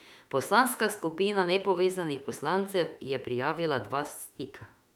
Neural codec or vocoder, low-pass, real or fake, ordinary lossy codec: autoencoder, 48 kHz, 32 numbers a frame, DAC-VAE, trained on Japanese speech; 19.8 kHz; fake; none